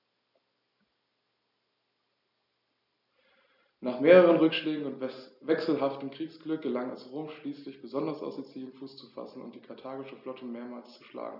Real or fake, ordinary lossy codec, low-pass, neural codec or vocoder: real; none; 5.4 kHz; none